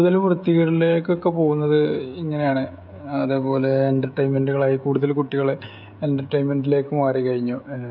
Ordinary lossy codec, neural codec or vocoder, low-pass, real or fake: none; codec, 16 kHz, 16 kbps, FreqCodec, smaller model; 5.4 kHz; fake